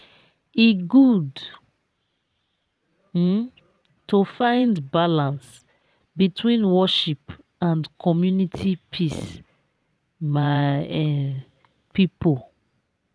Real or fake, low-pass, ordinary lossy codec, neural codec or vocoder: fake; none; none; vocoder, 22.05 kHz, 80 mel bands, Vocos